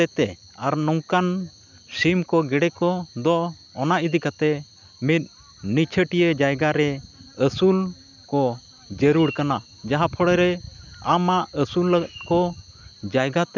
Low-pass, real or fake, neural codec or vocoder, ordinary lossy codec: 7.2 kHz; real; none; none